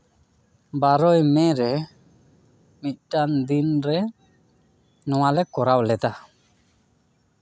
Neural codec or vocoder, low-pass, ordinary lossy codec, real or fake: none; none; none; real